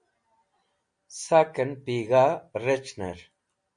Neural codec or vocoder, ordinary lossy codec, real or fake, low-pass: none; MP3, 48 kbps; real; 9.9 kHz